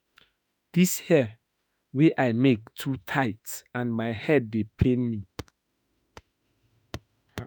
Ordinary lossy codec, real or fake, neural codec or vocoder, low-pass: none; fake; autoencoder, 48 kHz, 32 numbers a frame, DAC-VAE, trained on Japanese speech; none